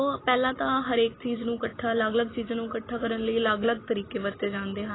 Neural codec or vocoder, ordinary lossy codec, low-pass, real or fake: none; AAC, 16 kbps; 7.2 kHz; real